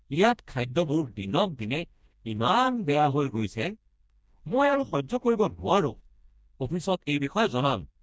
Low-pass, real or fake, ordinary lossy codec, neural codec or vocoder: none; fake; none; codec, 16 kHz, 1 kbps, FreqCodec, smaller model